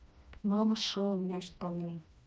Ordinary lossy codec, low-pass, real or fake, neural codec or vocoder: none; none; fake; codec, 16 kHz, 1 kbps, FreqCodec, smaller model